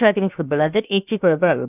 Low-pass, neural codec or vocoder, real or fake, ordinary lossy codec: 3.6 kHz; codec, 16 kHz, 0.7 kbps, FocalCodec; fake; none